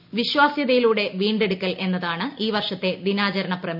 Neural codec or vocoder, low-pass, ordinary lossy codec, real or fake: none; 5.4 kHz; none; real